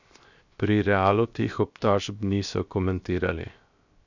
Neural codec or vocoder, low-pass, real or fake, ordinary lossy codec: codec, 16 kHz, 0.7 kbps, FocalCodec; 7.2 kHz; fake; none